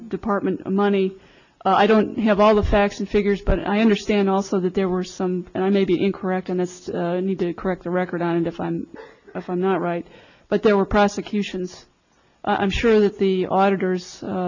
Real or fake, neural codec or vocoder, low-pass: real; none; 7.2 kHz